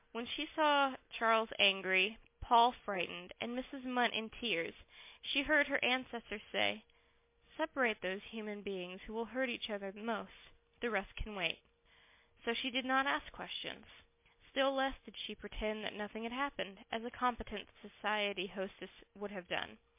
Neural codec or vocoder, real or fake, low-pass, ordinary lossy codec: none; real; 3.6 kHz; MP3, 24 kbps